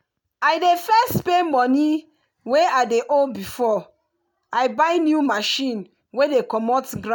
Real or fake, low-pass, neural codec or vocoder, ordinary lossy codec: real; none; none; none